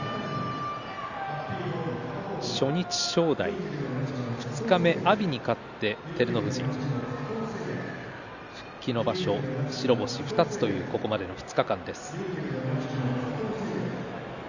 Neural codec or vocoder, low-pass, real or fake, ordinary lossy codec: none; 7.2 kHz; real; Opus, 64 kbps